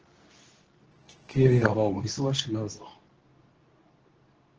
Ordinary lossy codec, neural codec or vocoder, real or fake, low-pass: Opus, 16 kbps; codec, 24 kHz, 0.9 kbps, WavTokenizer, medium speech release version 2; fake; 7.2 kHz